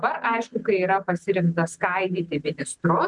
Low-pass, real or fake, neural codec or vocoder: 10.8 kHz; real; none